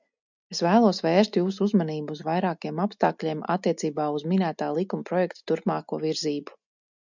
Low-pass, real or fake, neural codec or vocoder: 7.2 kHz; real; none